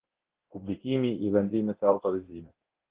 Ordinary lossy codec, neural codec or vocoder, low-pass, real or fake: Opus, 16 kbps; codec, 24 kHz, 0.9 kbps, DualCodec; 3.6 kHz; fake